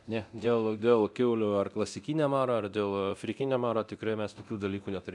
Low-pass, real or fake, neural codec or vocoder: 10.8 kHz; fake; codec, 24 kHz, 0.9 kbps, DualCodec